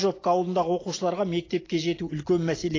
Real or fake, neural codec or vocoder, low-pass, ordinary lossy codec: real; none; 7.2 kHz; AAC, 32 kbps